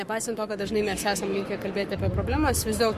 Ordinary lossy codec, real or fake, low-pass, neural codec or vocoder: MP3, 64 kbps; fake; 14.4 kHz; codec, 44.1 kHz, 7.8 kbps, Pupu-Codec